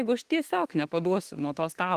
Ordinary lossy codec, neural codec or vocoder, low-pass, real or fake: Opus, 24 kbps; autoencoder, 48 kHz, 32 numbers a frame, DAC-VAE, trained on Japanese speech; 14.4 kHz; fake